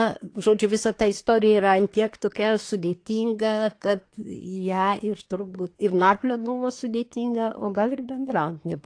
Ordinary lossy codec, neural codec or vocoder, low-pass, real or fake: AAC, 48 kbps; codec, 24 kHz, 1 kbps, SNAC; 9.9 kHz; fake